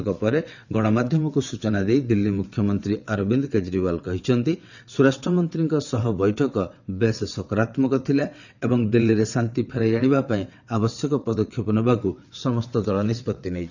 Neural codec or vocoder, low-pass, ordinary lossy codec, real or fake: vocoder, 22.05 kHz, 80 mel bands, WaveNeXt; 7.2 kHz; none; fake